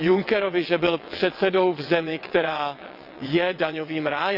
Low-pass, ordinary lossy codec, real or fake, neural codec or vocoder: 5.4 kHz; none; fake; vocoder, 22.05 kHz, 80 mel bands, WaveNeXt